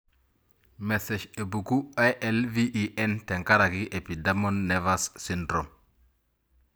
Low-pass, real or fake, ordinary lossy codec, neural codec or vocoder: none; real; none; none